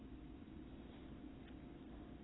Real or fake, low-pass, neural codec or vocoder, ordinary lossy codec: real; 7.2 kHz; none; AAC, 16 kbps